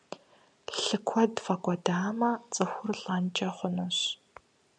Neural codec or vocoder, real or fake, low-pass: none; real; 9.9 kHz